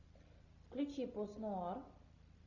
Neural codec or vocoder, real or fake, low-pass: none; real; 7.2 kHz